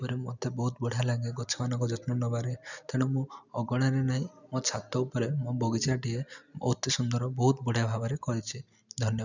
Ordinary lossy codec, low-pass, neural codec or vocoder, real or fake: none; 7.2 kHz; none; real